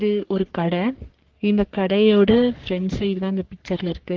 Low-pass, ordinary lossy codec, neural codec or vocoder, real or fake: 7.2 kHz; Opus, 16 kbps; codec, 44.1 kHz, 3.4 kbps, Pupu-Codec; fake